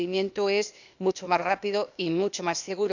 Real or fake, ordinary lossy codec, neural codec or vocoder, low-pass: fake; none; codec, 16 kHz, 0.8 kbps, ZipCodec; 7.2 kHz